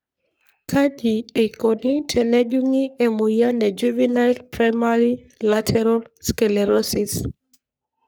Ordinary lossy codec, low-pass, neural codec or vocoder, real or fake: none; none; codec, 44.1 kHz, 3.4 kbps, Pupu-Codec; fake